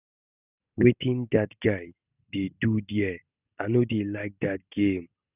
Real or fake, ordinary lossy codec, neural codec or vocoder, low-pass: real; none; none; 3.6 kHz